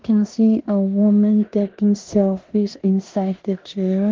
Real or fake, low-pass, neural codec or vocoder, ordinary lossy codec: fake; 7.2 kHz; codec, 16 kHz in and 24 kHz out, 0.9 kbps, LongCat-Audio-Codec, four codebook decoder; Opus, 16 kbps